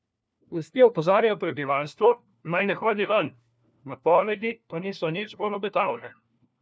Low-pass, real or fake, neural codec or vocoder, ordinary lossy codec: none; fake; codec, 16 kHz, 1 kbps, FunCodec, trained on LibriTTS, 50 frames a second; none